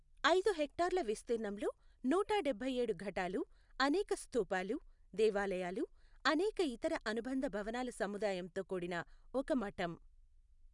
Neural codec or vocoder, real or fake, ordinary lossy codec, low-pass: none; real; none; 10.8 kHz